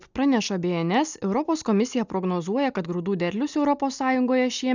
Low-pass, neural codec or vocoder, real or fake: 7.2 kHz; none; real